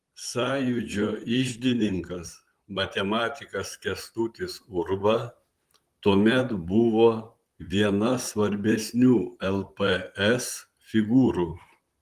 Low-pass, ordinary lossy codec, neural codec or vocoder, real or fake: 14.4 kHz; Opus, 24 kbps; vocoder, 44.1 kHz, 128 mel bands, Pupu-Vocoder; fake